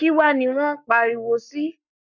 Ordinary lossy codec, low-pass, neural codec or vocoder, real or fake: none; 7.2 kHz; codec, 44.1 kHz, 7.8 kbps, Pupu-Codec; fake